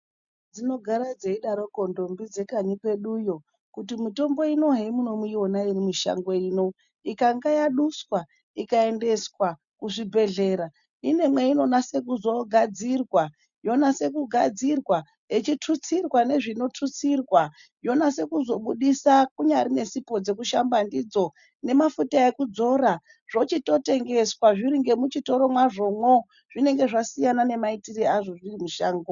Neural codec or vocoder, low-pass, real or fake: none; 7.2 kHz; real